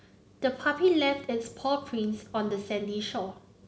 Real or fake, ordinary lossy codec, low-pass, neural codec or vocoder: real; none; none; none